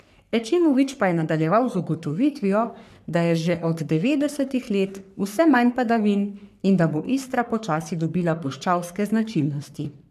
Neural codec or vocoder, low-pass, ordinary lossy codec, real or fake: codec, 44.1 kHz, 3.4 kbps, Pupu-Codec; 14.4 kHz; none; fake